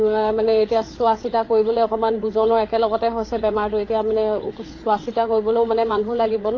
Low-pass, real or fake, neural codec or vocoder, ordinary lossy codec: 7.2 kHz; fake; codec, 16 kHz, 8 kbps, FreqCodec, larger model; AAC, 32 kbps